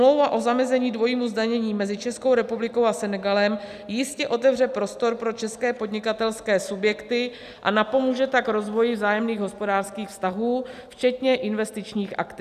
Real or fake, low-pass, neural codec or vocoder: real; 14.4 kHz; none